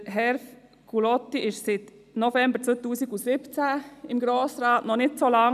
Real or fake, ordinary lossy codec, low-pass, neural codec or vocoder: real; none; 14.4 kHz; none